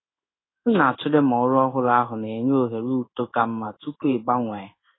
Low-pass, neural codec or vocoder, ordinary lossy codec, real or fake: 7.2 kHz; codec, 16 kHz in and 24 kHz out, 1 kbps, XY-Tokenizer; AAC, 16 kbps; fake